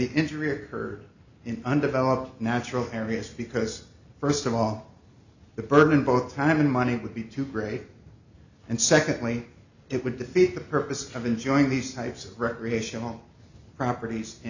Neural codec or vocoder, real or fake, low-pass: none; real; 7.2 kHz